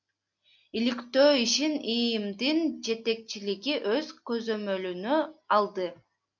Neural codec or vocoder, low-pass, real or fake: none; 7.2 kHz; real